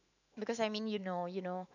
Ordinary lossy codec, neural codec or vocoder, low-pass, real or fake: none; autoencoder, 48 kHz, 128 numbers a frame, DAC-VAE, trained on Japanese speech; 7.2 kHz; fake